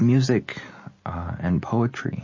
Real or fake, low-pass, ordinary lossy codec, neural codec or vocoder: real; 7.2 kHz; MP3, 32 kbps; none